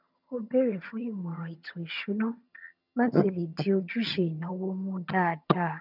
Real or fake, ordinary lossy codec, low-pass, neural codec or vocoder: fake; none; 5.4 kHz; vocoder, 22.05 kHz, 80 mel bands, HiFi-GAN